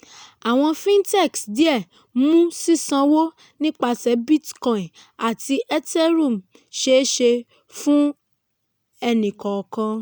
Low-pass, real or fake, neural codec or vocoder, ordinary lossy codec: none; real; none; none